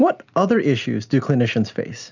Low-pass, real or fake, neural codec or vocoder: 7.2 kHz; real; none